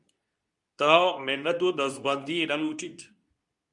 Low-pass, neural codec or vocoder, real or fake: 10.8 kHz; codec, 24 kHz, 0.9 kbps, WavTokenizer, medium speech release version 2; fake